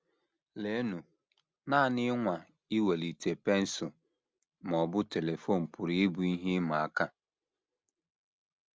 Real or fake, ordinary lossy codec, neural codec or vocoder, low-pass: real; none; none; none